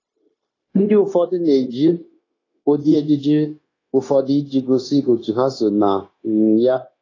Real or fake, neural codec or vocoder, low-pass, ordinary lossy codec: fake; codec, 16 kHz, 0.9 kbps, LongCat-Audio-Codec; 7.2 kHz; AAC, 32 kbps